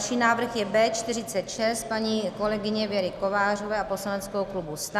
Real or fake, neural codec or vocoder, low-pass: real; none; 14.4 kHz